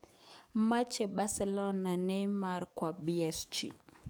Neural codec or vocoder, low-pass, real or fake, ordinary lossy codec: codec, 44.1 kHz, 7.8 kbps, DAC; none; fake; none